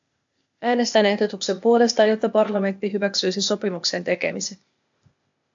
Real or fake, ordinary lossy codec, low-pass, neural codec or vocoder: fake; AAC, 64 kbps; 7.2 kHz; codec, 16 kHz, 0.8 kbps, ZipCodec